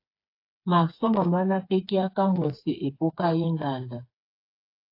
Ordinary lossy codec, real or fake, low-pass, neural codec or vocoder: AAC, 32 kbps; fake; 5.4 kHz; codec, 16 kHz, 4 kbps, FreqCodec, smaller model